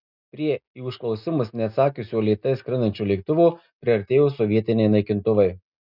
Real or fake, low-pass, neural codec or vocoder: real; 5.4 kHz; none